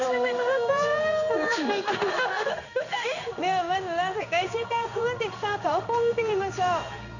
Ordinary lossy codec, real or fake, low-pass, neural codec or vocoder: none; fake; 7.2 kHz; codec, 16 kHz in and 24 kHz out, 1 kbps, XY-Tokenizer